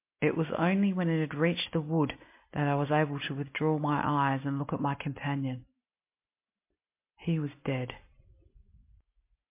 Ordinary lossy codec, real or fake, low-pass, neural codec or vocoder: MP3, 24 kbps; real; 3.6 kHz; none